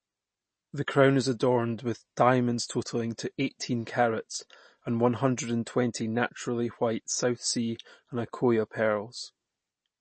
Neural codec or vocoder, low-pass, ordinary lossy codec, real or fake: none; 9.9 kHz; MP3, 32 kbps; real